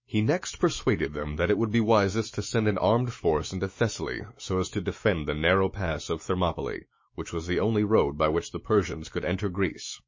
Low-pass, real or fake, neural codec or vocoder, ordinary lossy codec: 7.2 kHz; fake; autoencoder, 48 kHz, 128 numbers a frame, DAC-VAE, trained on Japanese speech; MP3, 32 kbps